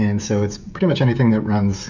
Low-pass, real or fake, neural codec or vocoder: 7.2 kHz; fake; codec, 16 kHz, 16 kbps, FreqCodec, smaller model